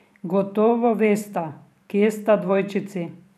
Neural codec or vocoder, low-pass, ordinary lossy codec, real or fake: vocoder, 44.1 kHz, 128 mel bands every 512 samples, BigVGAN v2; 14.4 kHz; none; fake